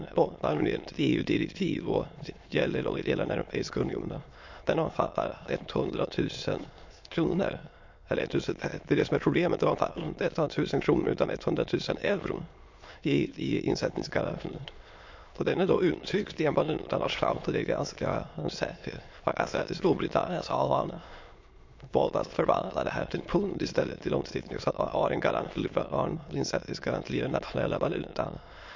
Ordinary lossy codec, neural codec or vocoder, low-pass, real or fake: MP3, 48 kbps; autoencoder, 22.05 kHz, a latent of 192 numbers a frame, VITS, trained on many speakers; 7.2 kHz; fake